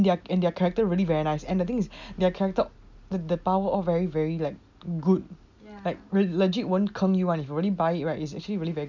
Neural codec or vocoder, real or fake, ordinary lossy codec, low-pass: none; real; none; 7.2 kHz